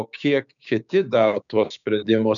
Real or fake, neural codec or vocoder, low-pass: fake; codec, 16 kHz, 6 kbps, DAC; 7.2 kHz